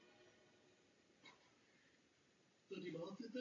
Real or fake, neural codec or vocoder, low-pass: real; none; 7.2 kHz